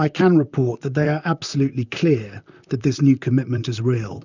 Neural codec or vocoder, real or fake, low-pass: vocoder, 44.1 kHz, 128 mel bands, Pupu-Vocoder; fake; 7.2 kHz